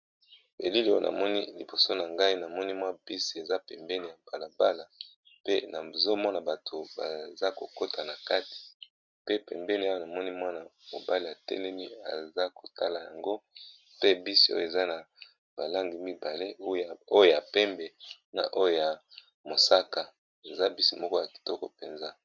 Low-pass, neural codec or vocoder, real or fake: 7.2 kHz; none; real